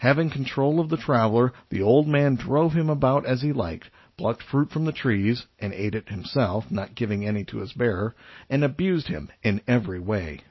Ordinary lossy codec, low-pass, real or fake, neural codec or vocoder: MP3, 24 kbps; 7.2 kHz; real; none